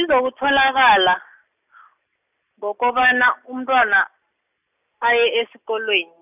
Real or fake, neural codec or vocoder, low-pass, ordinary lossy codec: real; none; 3.6 kHz; none